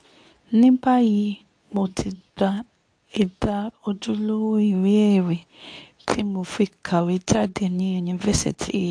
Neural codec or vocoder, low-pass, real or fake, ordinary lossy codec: codec, 24 kHz, 0.9 kbps, WavTokenizer, medium speech release version 2; 9.9 kHz; fake; AAC, 64 kbps